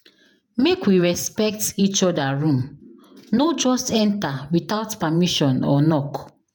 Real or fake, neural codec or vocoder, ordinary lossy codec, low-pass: fake; vocoder, 48 kHz, 128 mel bands, Vocos; none; none